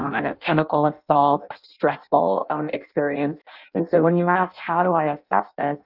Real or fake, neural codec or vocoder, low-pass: fake; codec, 16 kHz in and 24 kHz out, 0.6 kbps, FireRedTTS-2 codec; 5.4 kHz